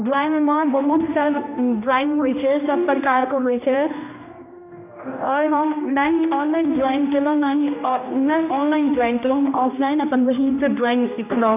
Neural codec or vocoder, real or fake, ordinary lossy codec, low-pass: codec, 16 kHz, 1 kbps, X-Codec, HuBERT features, trained on general audio; fake; none; 3.6 kHz